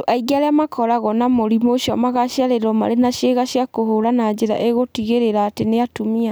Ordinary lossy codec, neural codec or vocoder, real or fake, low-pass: none; none; real; none